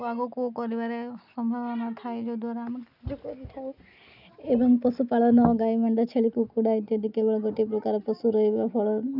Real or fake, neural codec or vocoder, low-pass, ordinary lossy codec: real; none; 5.4 kHz; none